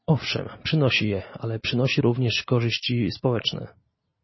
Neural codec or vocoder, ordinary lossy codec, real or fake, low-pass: none; MP3, 24 kbps; real; 7.2 kHz